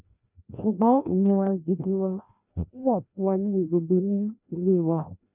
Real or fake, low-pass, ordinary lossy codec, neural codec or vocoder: fake; 3.6 kHz; none; codec, 16 kHz, 1 kbps, FreqCodec, larger model